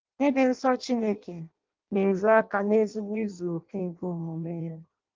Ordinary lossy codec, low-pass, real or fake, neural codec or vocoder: Opus, 24 kbps; 7.2 kHz; fake; codec, 16 kHz in and 24 kHz out, 0.6 kbps, FireRedTTS-2 codec